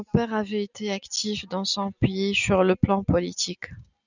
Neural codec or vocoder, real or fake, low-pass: none; real; 7.2 kHz